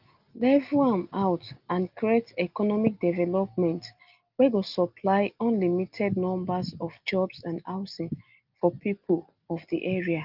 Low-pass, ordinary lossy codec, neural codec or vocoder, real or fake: 5.4 kHz; Opus, 32 kbps; none; real